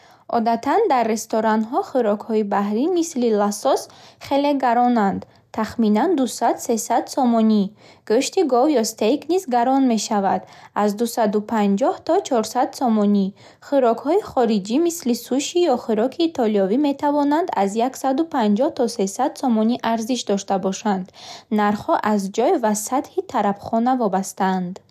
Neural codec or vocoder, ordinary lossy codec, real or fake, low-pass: none; none; real; 14.4 kHz